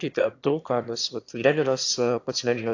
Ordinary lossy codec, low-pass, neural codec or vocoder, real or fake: AAC, 48 kbps; 7.2 kHz; autoencoder, 22.05 kHz, a latent of 192 numbers a frame, VITS, trained on one speaker; fake